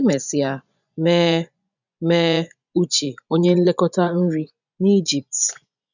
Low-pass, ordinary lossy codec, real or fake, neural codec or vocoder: 7.2 kHz; none; fake; vocoder, 24 kHz, 100 mel bands, Vocos